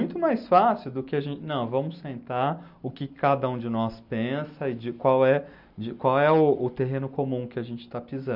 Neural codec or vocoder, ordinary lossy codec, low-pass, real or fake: none; none; 5.4 kHz; real